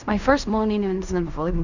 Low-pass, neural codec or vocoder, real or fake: 7.2 kHz; codec, 16 kHz in and 24 kHz out, 0.4 kbps, LongCat-Audio-Codec, fine tuned four codebook decoder; fake